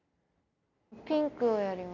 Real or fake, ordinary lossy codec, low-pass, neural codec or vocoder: real; none; 7.2 kHz; none